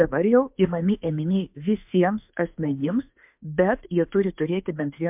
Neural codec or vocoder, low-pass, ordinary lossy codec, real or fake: autoencoder, 48 kHz, 32 numbers a frame, DAC-VAE, trained on Japanese speech; 3.6 kHz; MP3, 32 kbps; fake